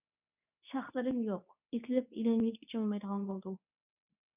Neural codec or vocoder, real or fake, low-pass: codec, 24 kHz, 0.9 kbps, WavTokenizer, medium speech release version 2; fake; 3.6 kHz